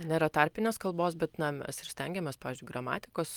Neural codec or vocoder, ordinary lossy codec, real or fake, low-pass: none; Opus, 32 kbps; real; 19.8 kHz